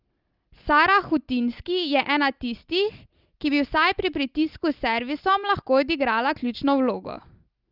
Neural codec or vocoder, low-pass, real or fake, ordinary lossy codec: none; 5.4 kHz; real; Opus, 32 kbps